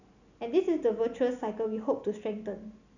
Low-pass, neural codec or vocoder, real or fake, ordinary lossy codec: 7.2 kHz; none; real; none